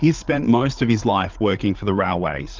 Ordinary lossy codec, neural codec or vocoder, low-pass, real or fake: Opus, 32 kbps; codec, 16 kHz, 8 kbps, FreqCodec, larger model; 7.2 kHz; fake